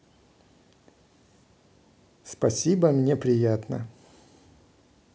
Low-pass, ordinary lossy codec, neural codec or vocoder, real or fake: none; none; none; real